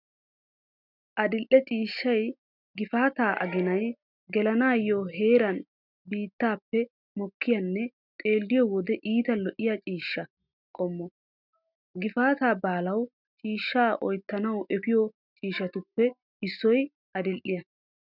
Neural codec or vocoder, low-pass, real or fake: none; 5.4 kHz; real